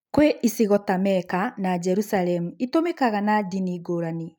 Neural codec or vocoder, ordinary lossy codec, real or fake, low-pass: none; none; real; none